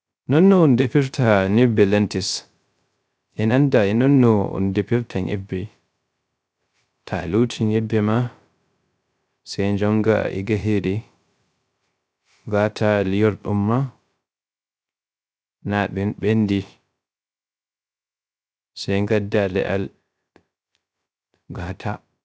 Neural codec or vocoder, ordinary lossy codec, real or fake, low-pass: codec, 16 kHz, 0.3 kbps, FocalCodec; none; fake; none